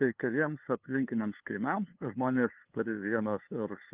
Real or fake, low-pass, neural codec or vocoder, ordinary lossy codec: fake; 3.6 kHz; codec, 16 kHz, 2 kbps, FunCodec, trained on LibriTTS, 25 frames a second; Opus, 24 kbps